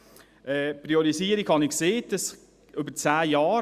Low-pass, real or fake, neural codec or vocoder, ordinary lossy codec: 14.4 kHz; real; none; Opus, 64 kbps